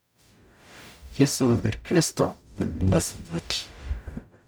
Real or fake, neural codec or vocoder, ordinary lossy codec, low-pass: fake; codec, 44.1 kHz, 0.9 kbps, DAC; none; none